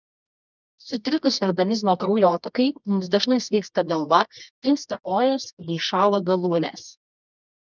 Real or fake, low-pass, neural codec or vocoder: fake; 7.2 kHz; codec, 24 kHz, 0.9 kbps, WavTokenizer, medium music audio release